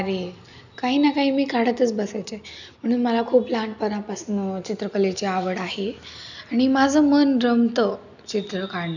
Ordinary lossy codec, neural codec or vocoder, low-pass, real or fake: none; none; 7.2 kHz; real